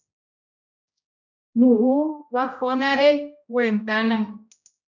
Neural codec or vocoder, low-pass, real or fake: codec, 16 kHz, 0.5 kbps, X-Codec, HuBERT features, trained on general audio; 7.2 kHz; fake